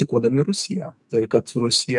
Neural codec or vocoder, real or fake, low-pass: codec, 44.1 kHz, 2.6 kbps, SNAC; fake; 10.8 kHz